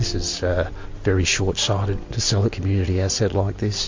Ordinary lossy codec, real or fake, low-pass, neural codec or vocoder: MP3, 48 kbps; real; 7.2 kHz; none